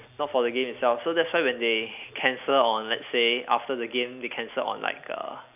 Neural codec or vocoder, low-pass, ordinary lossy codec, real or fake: none; 3.6 kHz; none; real